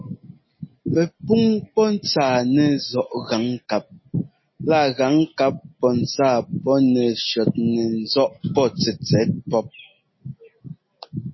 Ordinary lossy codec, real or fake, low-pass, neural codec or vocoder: MP3, 24 kbps; real; 7.2 kHz; none